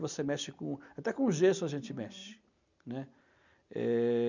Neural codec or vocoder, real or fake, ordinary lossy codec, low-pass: none; real; none; 7.2 kHz